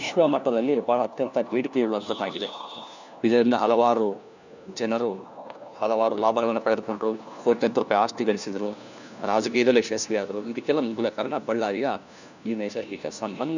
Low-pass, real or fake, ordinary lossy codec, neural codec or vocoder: 7.2 kHz; fake; none; codec, 16 kHz, 1 kbps, FunCodec, trained on LibriTTS, 50 frames a second